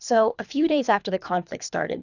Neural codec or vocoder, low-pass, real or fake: codec, 24 kHz, 3 kbps, HILCodec; 7.2 kHz; fake